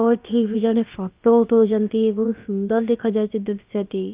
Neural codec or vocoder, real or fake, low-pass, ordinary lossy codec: codec, 16 kHz, about 1 kbps, DyCAST, with the encoder's durations; fake; 3.6 kHz; Opus, 24 kbps